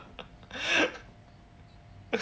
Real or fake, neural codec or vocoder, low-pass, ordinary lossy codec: real; none; none; none